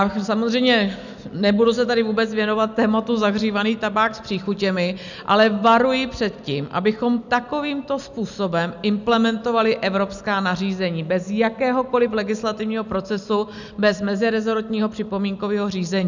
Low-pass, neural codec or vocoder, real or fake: 7.2 kHz; none; real